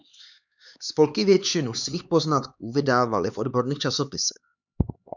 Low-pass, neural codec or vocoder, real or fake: 7.2 kHz; codec, 16 kHz, 4 kbps, X-Codec, HuBERT features, trained on LibriSpeech; fake